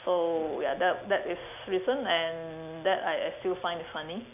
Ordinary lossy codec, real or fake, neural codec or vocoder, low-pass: none; real; none; 3.6 kHz